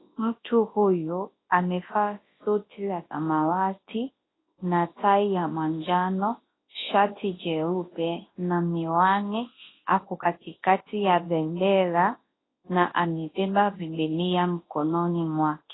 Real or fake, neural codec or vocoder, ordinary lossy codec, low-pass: fake; codec, 24 kHz, 0.9 kbps, WavTokenizer, large speech release; AAC, 16 kbps; 7.2 kHz